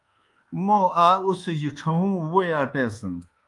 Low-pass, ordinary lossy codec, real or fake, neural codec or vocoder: 10.8 kHz; Opus, 32 kbps; fake; codec, 24 kHz, 1.2 kbps, DualCodec